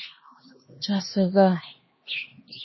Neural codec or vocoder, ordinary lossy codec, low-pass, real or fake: codec, 16 kHz, 2 kbps, X-Codec, HuBERT features, trained on LibriSpeech; MP3, 24 kbps; 7.2 kHz; fake